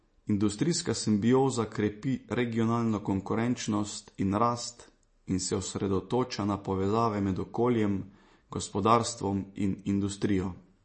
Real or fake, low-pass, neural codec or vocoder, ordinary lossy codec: real; 10.8 kHz; none; MP3, 32 kbps